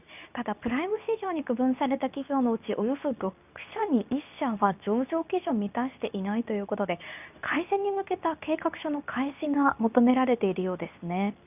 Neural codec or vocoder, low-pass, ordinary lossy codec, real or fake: codec, 24 kHz, 0.9 kbps, WavTokenizer, medium speech release version 2; 3.6 kHz; none; fake